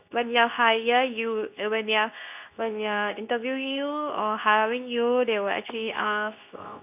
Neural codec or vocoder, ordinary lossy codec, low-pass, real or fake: codec, 24 kHz, 0.9 kbps, WavTokenizer, medium speech release version 2; none; 3.6 kHz; fake